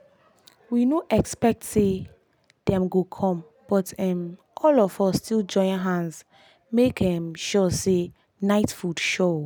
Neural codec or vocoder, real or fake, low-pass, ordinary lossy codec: none; real; none; none